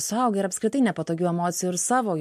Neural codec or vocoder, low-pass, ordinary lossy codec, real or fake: none; 14.4 kHz; MP3, 64 kbps; real